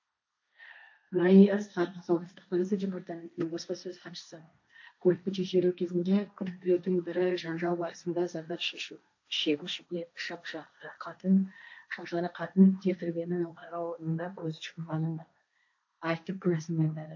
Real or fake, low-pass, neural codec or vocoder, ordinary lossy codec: fake; 7.2 kHz; codec, 16 kHz, 1.1 kbps, Voila-Tokenizer; AAC, 48 kbps